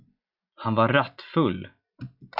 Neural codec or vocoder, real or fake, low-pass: none; real; 5.4 kHz